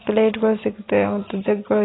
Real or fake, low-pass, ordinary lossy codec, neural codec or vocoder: real; 7.2 kHz; AAC, 16 kbps; none